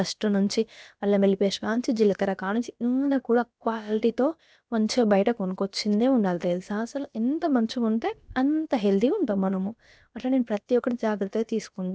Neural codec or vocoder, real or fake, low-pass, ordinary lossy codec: codec, 16 kHz, about 1 kbps, DyCAST, with the encoder's durations; fake; none; none